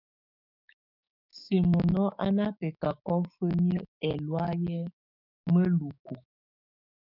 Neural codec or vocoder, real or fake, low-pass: codec, 16 kHz, 6 kbps, DAC; fake; 5.4 kHz